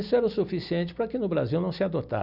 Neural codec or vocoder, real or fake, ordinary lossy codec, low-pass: vocoder, 44.1 kHz, 128 mel bands every 256 samples, BigVGAN v2; fake; none; 5.4 kHz